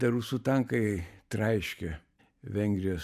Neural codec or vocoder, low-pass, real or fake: vocoder, 44.1 kHz, 128 mel bands every 512 samples, BigVGAN v2; 14.4 kHz; fake